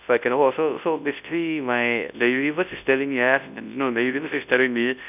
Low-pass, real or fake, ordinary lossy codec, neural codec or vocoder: 3.6 kHz; fake; none; codec, 24 kHz, 0.9 kbps, WavTokenizer, large speech release